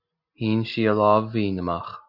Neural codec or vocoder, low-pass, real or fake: none; 5.4 kHz; real